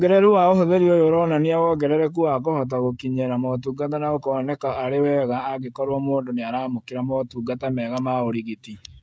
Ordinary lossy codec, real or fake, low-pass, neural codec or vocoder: none; fake; none; codec, 16 kHz, 16 kbps, FreqCodec, smaller model